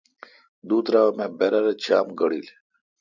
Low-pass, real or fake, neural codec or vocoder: 7.2 kHz; real; none